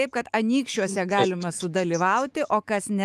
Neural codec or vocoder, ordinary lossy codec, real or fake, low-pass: autoencoder, 48 kHz, 128 numbers a frame, DAC-VAE, trained on Japanese speech; Opus, 32 kbps; fake; 14.4 kHz